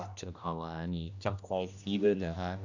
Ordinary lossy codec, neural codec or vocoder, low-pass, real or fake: none; codec, 16 kHz, 1 kbps, X-Codec, HuBERT features, trained on general audio; 7.2 kHz; fake